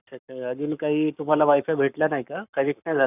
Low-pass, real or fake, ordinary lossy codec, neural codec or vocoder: 3.6 kHz; fake; none; codec, 44.1 kHz, 7.8 kbps, Pupu-Codec